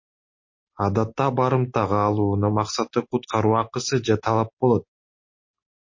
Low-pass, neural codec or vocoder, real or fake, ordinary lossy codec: 7.2 kHz; none; real; MP3, 32 kbps